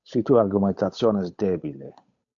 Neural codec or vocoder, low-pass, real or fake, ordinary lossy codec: codec, 16 kHz, 8 kbps, FunCodec, trained on Chinese and English, 25 frames a second; 7.2 kHz; fake; AAC, 64 kbps